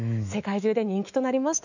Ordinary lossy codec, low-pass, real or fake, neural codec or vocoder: none; 7.2 kHz; fake; autoencoder, 48 kHz, 128 numbers a frame, DAC-VAE, trained on Japanese speech